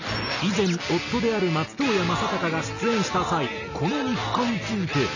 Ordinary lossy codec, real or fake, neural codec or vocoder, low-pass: none; real; none; 7.2 kHz